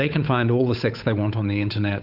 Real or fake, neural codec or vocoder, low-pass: fake; codec, 16 kHz, 8 kbps, FunCodec, trained on Chinese and English, 25 frames a second; 5.4 kHz